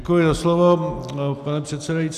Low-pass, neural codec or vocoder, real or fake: 14.4 kHz; none; real